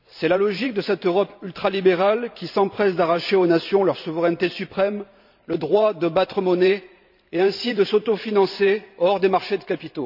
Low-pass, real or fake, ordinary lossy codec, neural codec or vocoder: 5.4 kHz; fake; none; vocoder, 44.1 kHz, 128 mel bands every 512 samples, BigVGAN v2